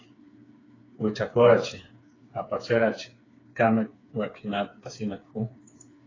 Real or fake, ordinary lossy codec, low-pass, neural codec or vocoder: fake; AAC, 32 kbps; 7.2 kHz; codec, 16 kHz, 4 kbps, FreqCodec, smaller model